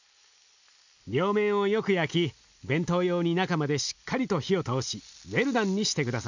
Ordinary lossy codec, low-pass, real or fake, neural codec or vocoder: none; 7.2 kHz; real; none